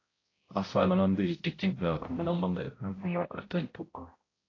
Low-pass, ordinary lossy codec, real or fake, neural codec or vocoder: 7.2 kHz; AAC, 32 kbps; fake; codec, 16 kHz, 0.5 kbps, X-Codec, HuBERT features, trained on balanced general audio